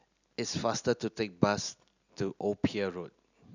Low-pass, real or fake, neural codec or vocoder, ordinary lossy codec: 7.2 kHz; real; none; none